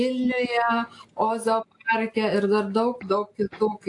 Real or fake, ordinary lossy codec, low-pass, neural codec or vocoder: real; AAC, 48 kbps; 10.8 kHz; none